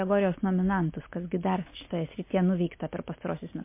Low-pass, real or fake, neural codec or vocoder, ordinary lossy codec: 3.6 kHz; real; none; MP3, 24 kbps